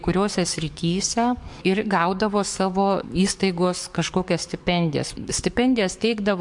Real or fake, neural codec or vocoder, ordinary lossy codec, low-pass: fake; codec, 44.1 kHz, 7.8 kbps, DAC; MP3, 64 kbps; 10.8 kHz